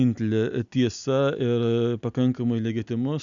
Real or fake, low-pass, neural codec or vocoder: real; 7.2 kHz; none